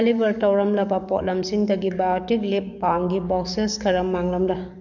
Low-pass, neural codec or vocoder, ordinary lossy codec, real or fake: 7.2 kHz; codec, 44.1 kHz, 7.8 kbps, DAC; none; fake